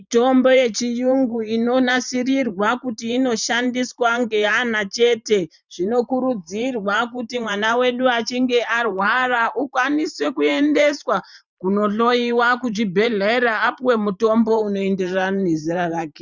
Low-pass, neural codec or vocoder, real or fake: 7.2 kHz; vocoder, 44.1 kHz, 128 mel bands every 512 samples, BigVGAN v2; fake